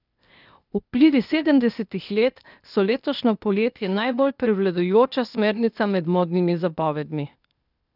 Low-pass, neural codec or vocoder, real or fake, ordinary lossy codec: 5.4 kHz; codec, 16 kHz, 0.8 kbps, ZipCodec; fake; none